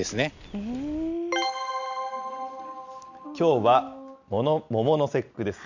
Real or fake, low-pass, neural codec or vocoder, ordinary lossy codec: real; 7.2 kHz; none; none